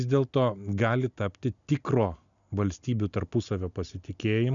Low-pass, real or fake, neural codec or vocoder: 7.2 kHz; real; none